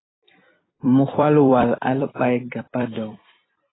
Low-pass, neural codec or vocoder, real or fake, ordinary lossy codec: 7.2 kHz; vocoder, 44.1 kHz, 128 mel bands every 256 samples, BigVGAN v2; fake; AAC, 16 kbps